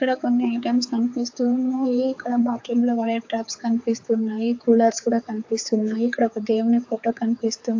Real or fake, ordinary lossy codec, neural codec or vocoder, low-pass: fake; AAC, 48 kbps; codec, 16 kHz, 4 kbps, X-Codec, HuBERT features, trained on general audio; 7.2 kHz